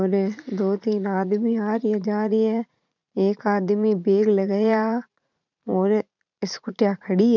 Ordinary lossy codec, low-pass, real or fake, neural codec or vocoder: none; 7.2 kHz; fake; vocoder, 44.1 kHz, 80 mel bands, Vocos